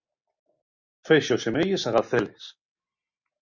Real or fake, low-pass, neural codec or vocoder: real; 7.2 kHz; none